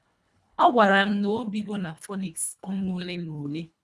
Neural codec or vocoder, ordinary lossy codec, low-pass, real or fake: codec, 24 kHz, 1.5 kbps, HILCodec; none; none; fake